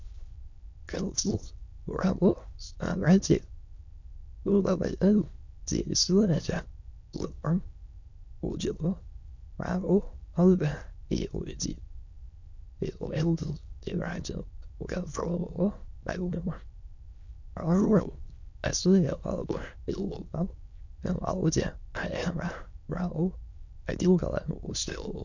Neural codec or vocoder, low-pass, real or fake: autoencoder, 22.05 kHz, a latent of 192 numbers a frame, VITS, trained on many speakers; 7.2 kHz; fake